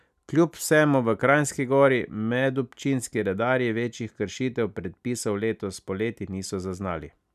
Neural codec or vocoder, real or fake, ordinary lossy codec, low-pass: none; real; none; 14.4 kHz